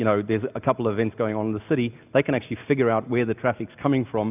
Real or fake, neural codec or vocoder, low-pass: real; none; 3.6 kHz